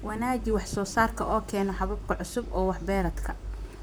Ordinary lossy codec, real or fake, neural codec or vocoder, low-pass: none; fake; vocoder, 44.1 kHz, 128 mel bands, Pupu-Vocoder; none